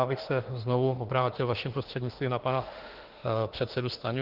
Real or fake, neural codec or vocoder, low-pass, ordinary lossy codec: fake; autoencoder, 48 kHz, 32 numbers a frame, DAC-VAE, trained on Japanese speech; 5.4 kHz; Opus, 16 kbps